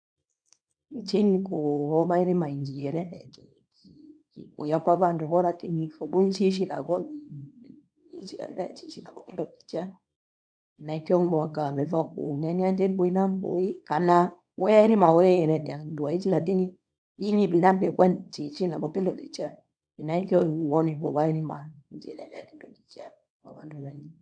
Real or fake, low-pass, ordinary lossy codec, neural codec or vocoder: fake; 9.9 kHz; none; codec, 24 kHz, 0.9 kbps, WavTokenizer, small release